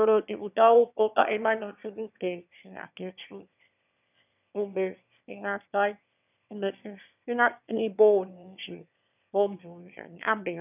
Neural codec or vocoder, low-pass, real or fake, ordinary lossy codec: autoencoder, 22.05 kHz, a latent of 192 numbers a frame, VITS, trained on one speaker; 3.6 kHz; fake; none